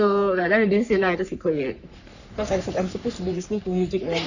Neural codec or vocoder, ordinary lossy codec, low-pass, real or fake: codec, 44.1 kHz, 3.4 kbps, Pupu-Codec; none; 7.2 kHz; fake